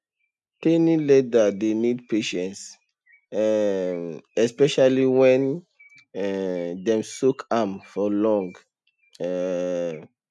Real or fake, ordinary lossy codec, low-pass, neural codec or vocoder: real; none; none; none